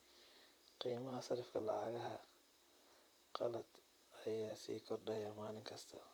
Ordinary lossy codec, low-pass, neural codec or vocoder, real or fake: none; none; vocoder, 44.1 kHz, 128 mel bands, Pupu-Vocoder; fake